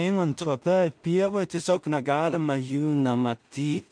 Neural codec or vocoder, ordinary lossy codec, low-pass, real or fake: codec, 16 kHz in and 24 kHz out, 0.4 kbps, LongCat-Audio-Codec, two codebook decoder; MP3, 64 kbps; 9.9 kHz; fake